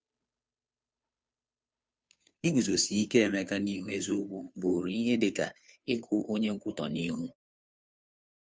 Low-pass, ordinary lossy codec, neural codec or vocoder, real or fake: none; none; codec, 16 kHz, 2 kbps, FunCodec, trained on Chinese and English, 25 frames a second; fake